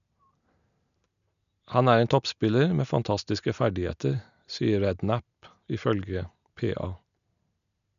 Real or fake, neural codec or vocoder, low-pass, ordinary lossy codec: real; none; 7.2 kHz; none